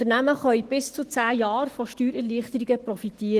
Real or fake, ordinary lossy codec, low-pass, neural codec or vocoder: fake; Opus, 16 kbps; 14.4 kHz; autoencoder, 48 kHz, 128 numbers a frame, DAC-VAE, trained on Japanese speech